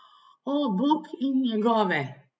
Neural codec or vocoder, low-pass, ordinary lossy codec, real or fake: none; none; none; real